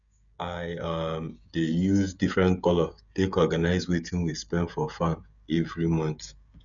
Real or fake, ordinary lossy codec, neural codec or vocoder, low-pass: fake; none; codec, 16 kHz, 16 kbps, FreqCodec, smaller model; 7.2 kHz